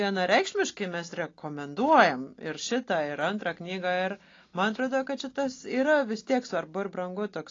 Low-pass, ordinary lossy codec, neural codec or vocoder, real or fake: 7.2 kHz; AAC, 32 kbps; none; real